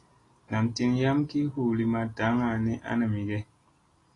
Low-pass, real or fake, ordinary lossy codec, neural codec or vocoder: 10.8 kHz; real; AAC, 32 kbps; none